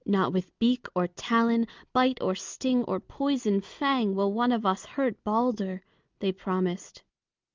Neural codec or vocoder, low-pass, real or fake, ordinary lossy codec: none; 7.2 kHz; real; Opus, 24 kbps